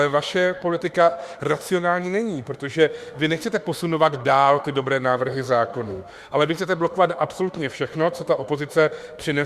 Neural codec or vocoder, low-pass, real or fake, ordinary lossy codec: autoencoder, 48 kHz, 32 numbers a frame, DAC-VAE, trained on Japanese speech; 14.4 kHz; fake; Opus, 64 kbps